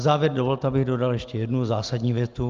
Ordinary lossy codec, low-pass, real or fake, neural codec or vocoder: Opus, 24 kbps; 7.2 kHz; real; none